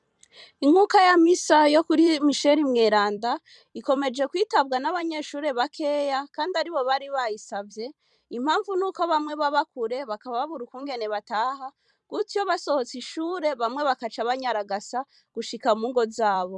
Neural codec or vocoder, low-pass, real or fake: vocoder, 44.1 kHz, 128 mel bands every 256 samples, BigVGAN v2; 10.8 kHz; fake